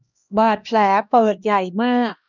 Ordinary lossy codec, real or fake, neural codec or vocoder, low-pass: none; fake; codec, 16 kHz, 1 kbps, X-Codec, HuBERT features, trained on LibriSpeech; 7.2 kHz